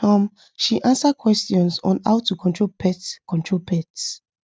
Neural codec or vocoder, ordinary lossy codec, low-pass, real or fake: none; none; none; real